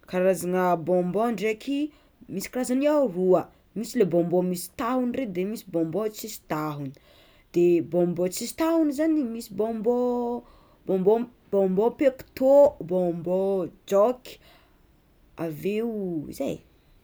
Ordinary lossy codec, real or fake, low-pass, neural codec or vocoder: none; real; none; none